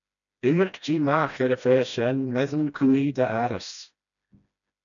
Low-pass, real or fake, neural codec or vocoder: 7.2 kHz; fake; codec, 16 kHz, 1 kbps, FreqCodec, smaller model